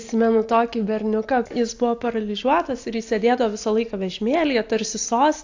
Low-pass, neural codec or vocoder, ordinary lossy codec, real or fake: 7.2 kHz; none; AAC, 48 kbps; real